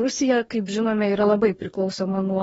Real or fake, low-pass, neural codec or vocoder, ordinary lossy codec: fake; 19.8 kHz; codec, 44.1 kHz, 2.6 kbps, DAC; AAC, 24 kbps